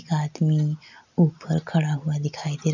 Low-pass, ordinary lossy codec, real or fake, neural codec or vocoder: 7.2 kHz; none; real; none